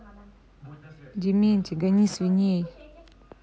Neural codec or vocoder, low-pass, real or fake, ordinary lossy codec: none; none; real; none